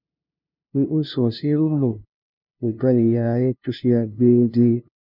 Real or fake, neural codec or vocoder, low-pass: fake; codec, 16 kHz, 0.5 kbps, FunCodec, trained on LibriTTS, 25 frames a second; 5.4 kHz